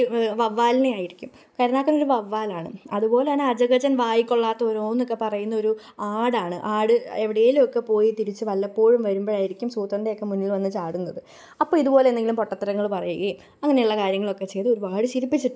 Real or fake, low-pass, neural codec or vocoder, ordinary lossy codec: real; none; none; none